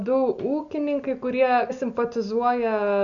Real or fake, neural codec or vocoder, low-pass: real; none; 7.2 kHz